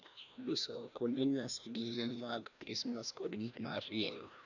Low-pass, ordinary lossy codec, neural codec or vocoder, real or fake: 7.2 kHz; none; codec, 16 kHz, 1 kbps, FreqCodec, larger model; fake